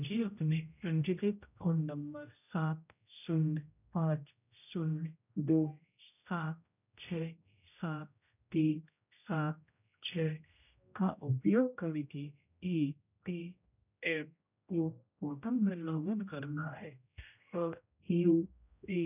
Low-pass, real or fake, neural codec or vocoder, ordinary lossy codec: 3.6 kHz; fake; codec, 16 kHz, 0.5 kbps, X-Codec, HuBERT features, trained on general audio; none